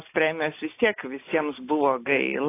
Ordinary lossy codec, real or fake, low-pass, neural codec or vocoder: AAC, 24 kbps; real; 3.6 kHz; none